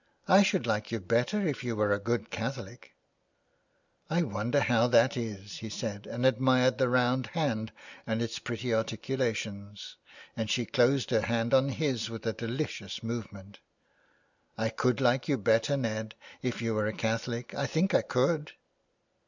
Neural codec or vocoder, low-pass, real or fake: none; 7.2 kHz; real